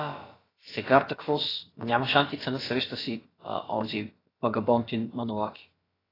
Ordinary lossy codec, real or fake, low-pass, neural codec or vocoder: AAC, 24 kbps; fake; 5.4 kHz; codec, 16 kHz, about 1 kbps, DyCAST, with the encoder's durations